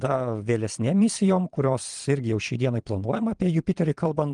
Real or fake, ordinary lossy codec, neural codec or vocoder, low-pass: fake; Opus, 24 kbps; vocoder, 22.05 kHz, 80 mel bands, Vocos; 9.9 kHz